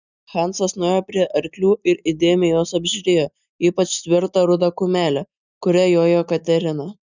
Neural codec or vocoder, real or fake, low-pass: none; real; 7.2 kHz